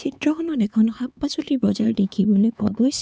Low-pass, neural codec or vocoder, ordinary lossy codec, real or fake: none; codec, 16 kHz, 4 kbps, X-Codec, HuBERT features, trained on LibriSpeech; none; fake